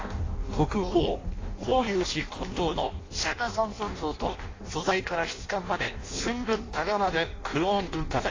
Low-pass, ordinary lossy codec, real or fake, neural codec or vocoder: 7.2 kHz; AAC, 32 kbps; fake; codec, 16 kHz in and 24 kHz out, 0.6 kbps, FireRedTTS-2 codec